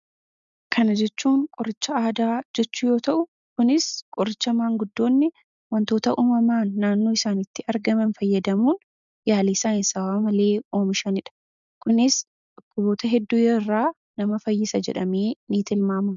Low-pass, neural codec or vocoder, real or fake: 7.2 kHz; none; real